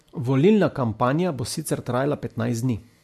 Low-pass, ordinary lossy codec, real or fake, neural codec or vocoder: 14.4 kHz; MP3, 64 kbps; real; none